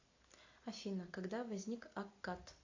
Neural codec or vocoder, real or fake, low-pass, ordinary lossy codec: none; real; 7.2 kHz; AAC, 48 kbps